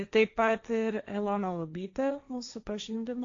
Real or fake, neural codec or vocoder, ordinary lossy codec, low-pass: fake; codec, 16 kHz, 1.1 kbps, Voila-Tokenizer; AAC, 48 kbps; 7.2 kHz